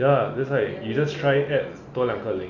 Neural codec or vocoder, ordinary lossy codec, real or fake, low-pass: none; none; real; 7.2 kHz